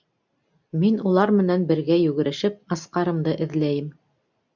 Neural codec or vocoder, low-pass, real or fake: none; 7.2 kHz; real